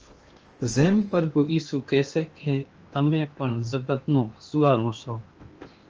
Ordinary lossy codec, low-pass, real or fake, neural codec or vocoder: Opus, 24 kbps; 7.2 kHz; fake; codec, 16 kHz in and 24 kHz out, 0.8 kbps, FocalCodec, streaming, 65536 codes